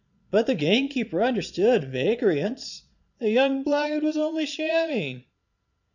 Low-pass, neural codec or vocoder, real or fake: 7.2 kHz; vocoder, 22.05 kHz, 80 mel bands, Vocos; fake